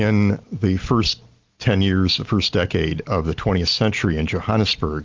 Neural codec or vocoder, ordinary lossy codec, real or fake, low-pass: none; Opus, 24 kbps; real; 7.2 kHz